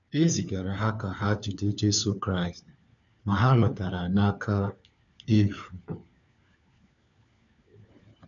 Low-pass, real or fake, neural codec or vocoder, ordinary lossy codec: 7.2 kHz; fake; codec, 16 kHz, 4 kbps, FunCodec, trained on LibriTTS, 50 frames a second; none